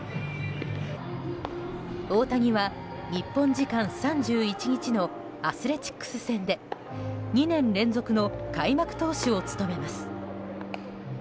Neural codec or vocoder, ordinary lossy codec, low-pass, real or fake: none; none; none; real